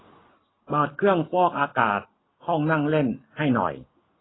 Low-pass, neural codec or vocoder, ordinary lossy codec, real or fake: 7.2 kHz; none; AAC, 16 kbps; real